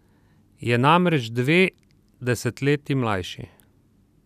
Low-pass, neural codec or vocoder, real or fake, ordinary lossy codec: 14.4 kHz; none; real; none